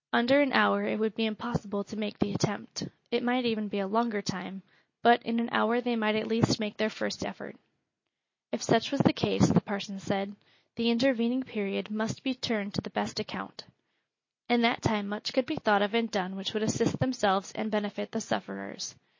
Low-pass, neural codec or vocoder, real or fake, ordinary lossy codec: 7.2 kHz; none; real; MP3, 32 kbps